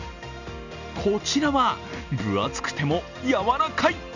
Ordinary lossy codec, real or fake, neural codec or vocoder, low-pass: none; real; none; 7.2 kHz